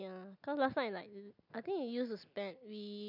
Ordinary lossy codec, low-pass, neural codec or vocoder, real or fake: none; 5.4 kHz; none; real